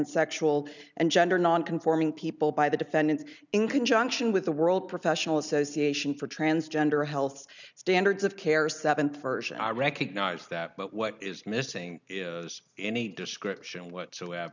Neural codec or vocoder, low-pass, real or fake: none; 7.2 kHz; real